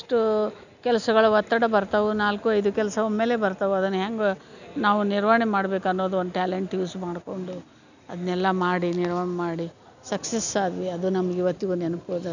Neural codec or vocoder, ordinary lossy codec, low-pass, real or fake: none; none; 7.2 kHz; real